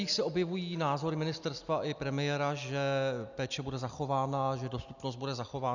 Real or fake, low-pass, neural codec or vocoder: real; 7.2 kHz; none